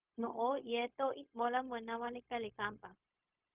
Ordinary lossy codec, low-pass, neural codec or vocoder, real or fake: Opus, 24 kbps; 3.6 kHz; codec, 16 kHz, 0.4 kbps, LongCat-Audio-Codec; fake